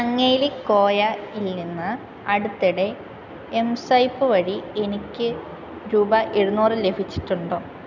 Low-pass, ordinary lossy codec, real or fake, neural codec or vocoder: 7.2 kHz; none; real; none